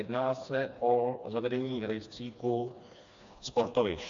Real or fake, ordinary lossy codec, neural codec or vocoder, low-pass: fake; AAC, 64 kbps; codec, 16 kHz, 2 kbps, FreqCodec, smaller model; 7.2 kHz